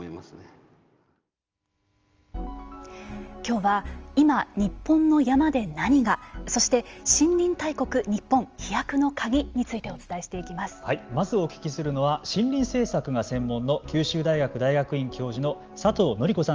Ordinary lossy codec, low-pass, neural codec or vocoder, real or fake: Opus, 24 kbps; 7.2 kHz; none; real